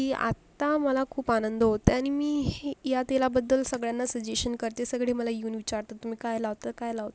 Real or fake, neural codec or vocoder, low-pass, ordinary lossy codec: real; none; none; none